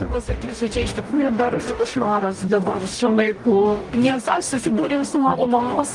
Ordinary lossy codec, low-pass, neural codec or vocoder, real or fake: Opus, 24 kbps; 10.8 kHz; codec, 44.1 kHz, 0.9 kbps, DAC; fake